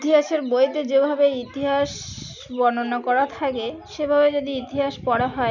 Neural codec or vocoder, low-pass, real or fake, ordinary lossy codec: none; 7.2 kHz; real; none